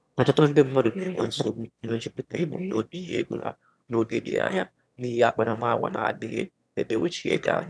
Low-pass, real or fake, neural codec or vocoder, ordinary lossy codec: none; fake; autoencoder, 22.05 kHz, a latent of 192 numbers a frame, VITS, trained on one speaker; none